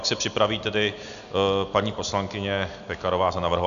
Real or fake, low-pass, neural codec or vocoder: real; 7.2 kHz; none